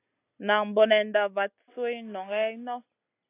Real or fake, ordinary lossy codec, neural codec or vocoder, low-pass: real; AAC, 24 kbps; none; 3.6 kHz